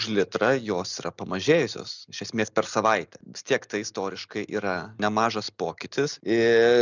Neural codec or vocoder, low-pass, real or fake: none; 7.2 kHz; real